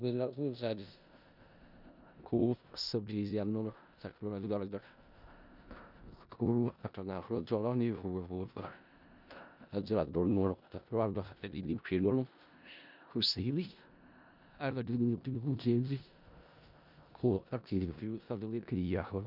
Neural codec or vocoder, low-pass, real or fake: codec, 16 kHz in and 24 kHz out, 0.4 kbps, LongCat-Audio-Codec, four codebook decoder; 5.4 kHz; fake